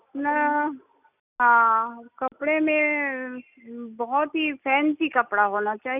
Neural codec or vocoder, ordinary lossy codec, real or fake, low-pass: none; none; real; 3.6 kHz